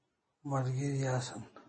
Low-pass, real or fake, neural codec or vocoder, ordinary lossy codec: 9.9 kHz; real; none; MP3, 32 kbps